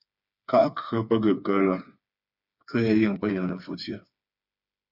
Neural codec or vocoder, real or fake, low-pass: codec, 16 kHz, 4 kbps, FreqCodec, smaller model; fake; 5.4 kHz